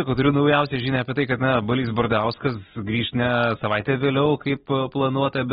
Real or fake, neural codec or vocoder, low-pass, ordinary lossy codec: real; none; 19.8 kHz; AAC, 16 kbps